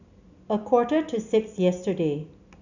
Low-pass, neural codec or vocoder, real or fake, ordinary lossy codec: 7.2 kHz; none; real; none